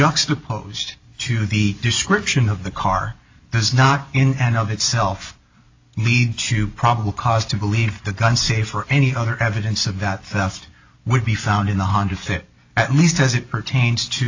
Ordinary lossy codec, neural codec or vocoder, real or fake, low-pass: AAC, 48 kbps; none; real; 7.2 kHz